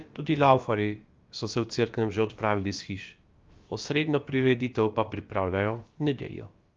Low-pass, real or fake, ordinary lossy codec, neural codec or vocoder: 7.2 kHz; fake; Opus, 32 kbps; codec, 16 kHz, about 1 kbps, DyCAST, with the encoder's durations